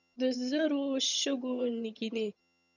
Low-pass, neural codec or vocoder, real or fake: 7.2 kHz; vocoder, 22.05 kHz, 80 mel bands, HiFi-GAN; fake